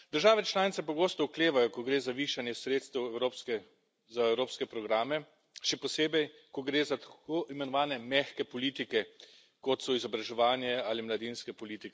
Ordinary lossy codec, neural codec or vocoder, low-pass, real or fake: none; none; none; real